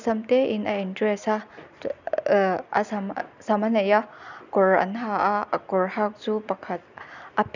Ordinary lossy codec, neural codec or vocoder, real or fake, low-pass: none; none; real; 7.2 kHz